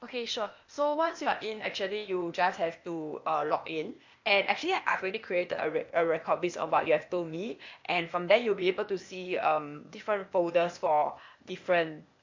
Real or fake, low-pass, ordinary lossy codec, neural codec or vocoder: fake; 7.2 kHz; MP3, 48 kbps; codec, 16 kHz, 0.8 kbps, ZipCodec